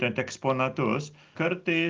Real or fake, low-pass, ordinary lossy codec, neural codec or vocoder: real; 7.2 kHz; Opus, 24 kbps; none